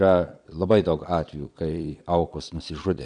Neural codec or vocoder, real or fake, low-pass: vocoder, 22.05 kHz, 80 mel bands, Vocos; fake; 9.9 kHz